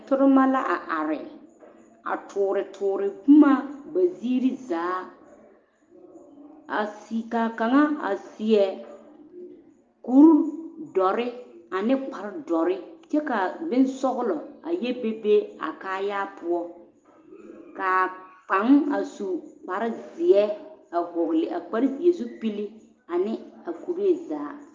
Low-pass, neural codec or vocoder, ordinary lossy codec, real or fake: 7.2 kHz; none; Opus, 24 kbps; real